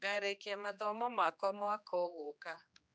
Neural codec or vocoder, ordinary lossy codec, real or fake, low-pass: codec, 16 kHz, 2 kbps, X-Codec, HuBERT features, trained on general audio; none; fake; none